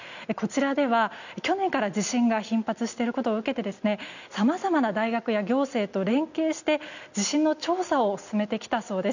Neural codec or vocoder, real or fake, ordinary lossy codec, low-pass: none; real; none; 7.2 kHz